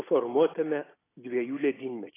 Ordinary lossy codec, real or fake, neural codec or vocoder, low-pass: AAC, 16 kbps; real; none; 3.6 kHz